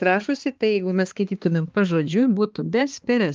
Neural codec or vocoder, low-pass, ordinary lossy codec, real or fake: codec, 16 kHz, 2 kbps, X-Codec, HuBERT features, trained on balanced general audio; 7.2 kHz; Opus, 24 kbps; fake